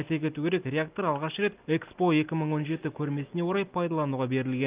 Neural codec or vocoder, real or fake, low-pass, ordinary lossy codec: none; real; 3.6 kHz; Opus, 24 kbps